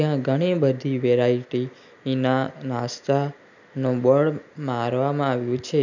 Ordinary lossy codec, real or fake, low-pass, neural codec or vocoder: none; real; 7.2 kHz; none